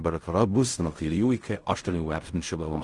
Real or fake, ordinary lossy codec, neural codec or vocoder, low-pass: fake; Opus, 32 kbps; codec, 16 kHz in and 24 kHz out, 0.4 kbps, LongCat-Audio-Codec, fine tuned four codebook decoder; 10.8 kHz